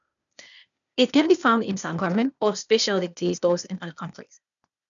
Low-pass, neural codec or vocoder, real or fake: 7.2 kHz; codec, 16 kHz, 0.8 kbps, ZipCodec; fake